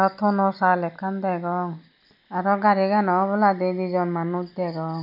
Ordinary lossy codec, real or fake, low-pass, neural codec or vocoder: none; real; 5.4 kHz; none